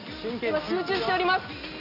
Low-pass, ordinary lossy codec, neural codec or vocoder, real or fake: 5.4 kHz; none; none; real